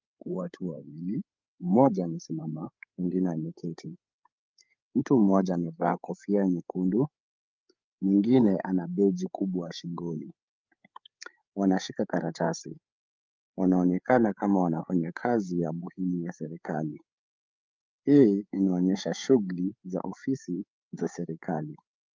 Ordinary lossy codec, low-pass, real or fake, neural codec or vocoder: Opus, 24 kbps; 7.2 kHz; fake; codec, 16 kHz, 8 kbps, FreqCodec, larger model